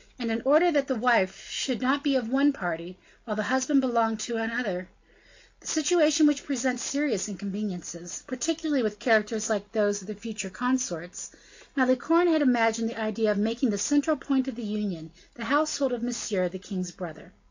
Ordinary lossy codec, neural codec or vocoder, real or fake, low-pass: AAC, 48 kbps; none; real; 7.2 kHz